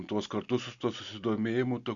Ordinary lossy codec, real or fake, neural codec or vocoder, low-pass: Opus, 64 kbps; real; none; 7.2 kHz